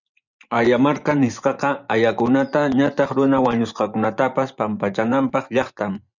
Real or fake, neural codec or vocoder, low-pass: fake; vocoder, 24 kHz, 100 mel bands, Vocos; 7.2 kHz